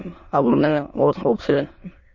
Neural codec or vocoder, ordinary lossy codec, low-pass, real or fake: autoencoder, 22.05 kHz, a latent of 192 numbers a frame, VITS, trained on many speakers; MP3, 32 kbps; 7.2 kHz; fake